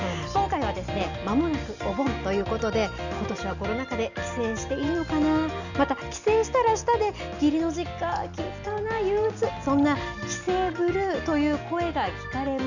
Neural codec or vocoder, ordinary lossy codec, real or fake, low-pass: none; none; real; 7.2 kHz